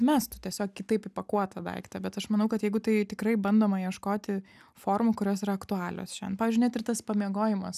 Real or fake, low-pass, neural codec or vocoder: real; 14.4 kHz; none